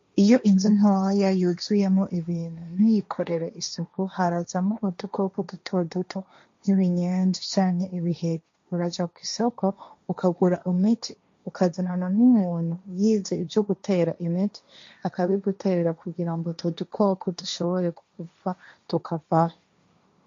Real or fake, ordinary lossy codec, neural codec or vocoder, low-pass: fake; MP3, 48 kbps; codec, 16 kHz, 1.1 kbps, Voila-Tokenizer; 7.2 kHz